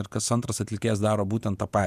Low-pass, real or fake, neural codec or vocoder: 14.4 kHz; fake; vocoder, 48 kHz, 128 mel bands, Vocos